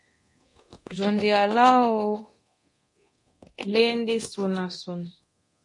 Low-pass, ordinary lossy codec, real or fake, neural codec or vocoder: 10.8 kHz; MP3, 48 kbps; fake; codec, 24 kHz, 0.9 kbps, DualCodec